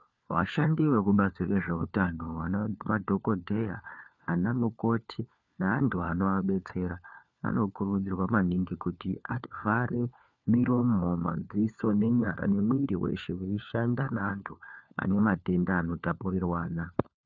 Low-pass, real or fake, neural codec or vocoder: 7.2 kHz; fake; codec, 16 kHz, 4 kbps, FunCodec, trained on LibriTTS, 50 frames a second